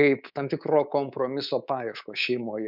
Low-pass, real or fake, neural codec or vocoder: 5.4 kHz; fake; codec, 24 kHz, 3.1 kbps, DualCodec